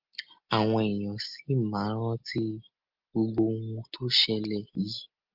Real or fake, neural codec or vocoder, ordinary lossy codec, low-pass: real; none; Opus, 24 kbps; 5.4 kHz